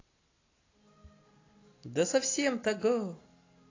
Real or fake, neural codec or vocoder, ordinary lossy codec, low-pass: real; none; AAC, 32 kbps; 7.2 kHz